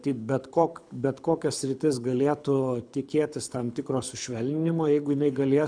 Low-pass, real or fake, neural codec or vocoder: 9.9 kHz; fake; codec, 24 kHz, 6 kbps, HILCodec